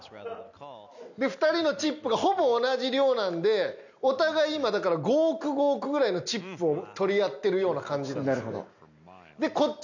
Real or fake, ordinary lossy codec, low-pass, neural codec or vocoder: real; none; 7.2 kHz; none